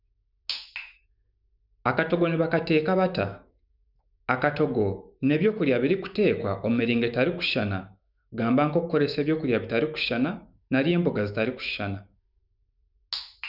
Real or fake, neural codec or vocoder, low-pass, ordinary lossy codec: fake; autoencoder, 48 kHz, 128 numbers a frame, DAC-VAE, trained on Japanese speech; 5.4 kHz; none